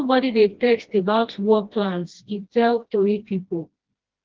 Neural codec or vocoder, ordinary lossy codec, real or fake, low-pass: codec, 16 kHz, 1 kbps, FreqCodec, smaller model; Opus, 16 kbps; fake; 7.2 kHz